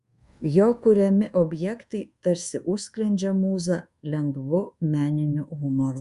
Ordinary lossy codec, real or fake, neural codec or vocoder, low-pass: Opus, 64 kbps; fake; codec, 24 kHz, 1.2 kbps, DualCodec; 10.8 kHz